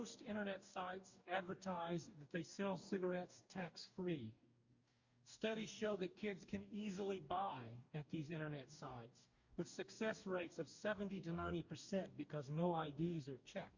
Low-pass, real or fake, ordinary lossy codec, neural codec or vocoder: 7.2 kHz; fake; Opus, 64 kbps; codec, 44.1 kHz, 2.6 kbps, DAC